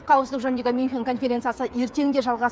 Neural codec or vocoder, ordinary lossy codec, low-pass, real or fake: codec, 16 kHz, 8 kbps, FreqCodec, smaller model; none; none; fake